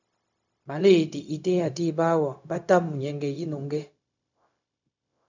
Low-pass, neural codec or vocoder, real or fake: 7.2 kHz; codec, 16 kHz, 0.4 kbps, LongCat-Audio-Codec; fake